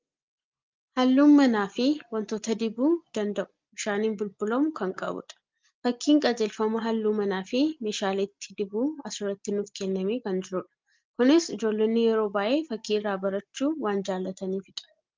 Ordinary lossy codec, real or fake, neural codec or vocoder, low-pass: Opus, 24 kbps; real; none; 7.2 kHz